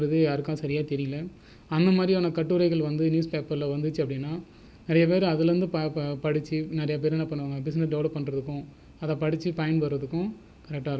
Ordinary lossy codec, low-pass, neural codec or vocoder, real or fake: none; none; none; real